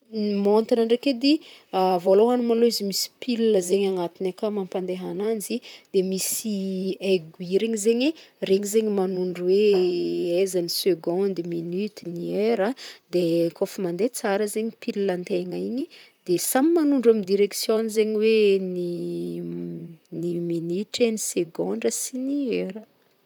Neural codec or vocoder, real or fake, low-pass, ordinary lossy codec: vocoder, 44.1 kHz, 128 mel bands, Pupu-Vocoder; fake; none; none